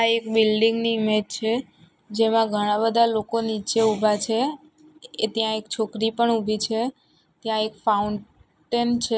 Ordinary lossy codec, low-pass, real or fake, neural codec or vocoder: none; none; real; none